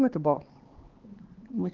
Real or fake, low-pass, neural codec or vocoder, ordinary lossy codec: fake; 7.2 kHz; codec, 16 kHz, 4 kbps, X-Codec, HuBERT features, trained on LibriSpeech; Opus, 16 kbps